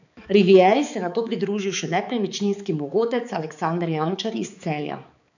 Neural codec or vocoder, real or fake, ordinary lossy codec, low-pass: codec, 16 kHz, 4 kbps, X-Codec, HuBERT features, trained on balanced general audio; fake; none; 7.2 kHz